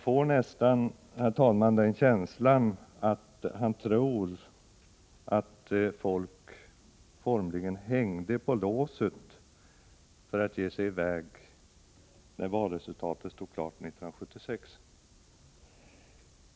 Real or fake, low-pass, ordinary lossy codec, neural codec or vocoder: real; none; none; none